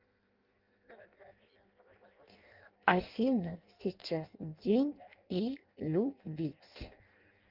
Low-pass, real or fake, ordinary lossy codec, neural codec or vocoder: 5.4 kHz; fake; Opus, 32 kbps; codec, 16 kHz in and 24 kHz out, 0.6 kbps, FireRedTTS-2 codec